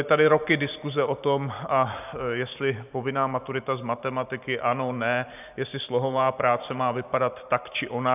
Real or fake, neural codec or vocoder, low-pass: fake; vocoder, 44.1 kHz, 128 mel bands every 512 samples, BigVGAN v2; 3.6 kHz